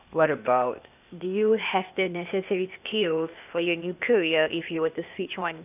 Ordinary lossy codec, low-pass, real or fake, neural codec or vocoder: none; 3.6 kHz; fake; codec, 16 kHz, 0.8 kbps, ZipCodec